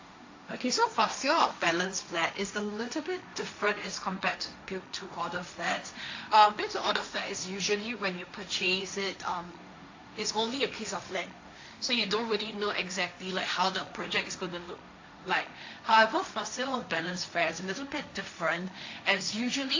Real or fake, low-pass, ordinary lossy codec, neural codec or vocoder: fake; 7.2 kHz; AAC, 48 kbps; codec, 16 kHz, 1.1 kbps, Voila-Tokenizer